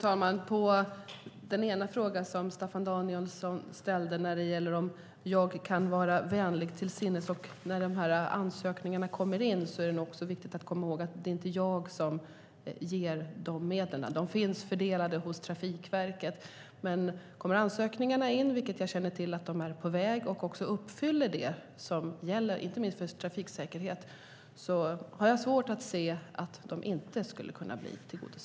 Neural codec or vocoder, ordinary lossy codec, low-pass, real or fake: none; none; none; real